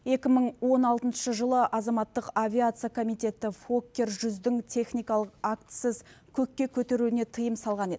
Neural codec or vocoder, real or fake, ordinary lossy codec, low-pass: none; real; none; none